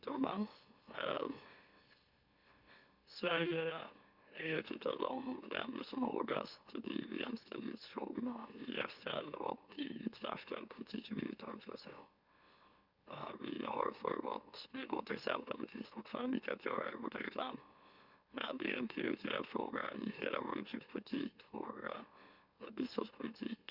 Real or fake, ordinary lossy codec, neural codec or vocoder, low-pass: fake; Opus, 64 kbps; autoencoder, 44.1 kHz, a latent of 192 numbers a frame, MeloTTS; 5.4 kHz